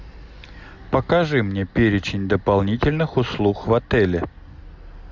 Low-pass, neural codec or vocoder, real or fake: 7.2 kHz; none; real